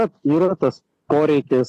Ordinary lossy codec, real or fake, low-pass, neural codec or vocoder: AAC, 48 kbps; real; 14.4 kHz; none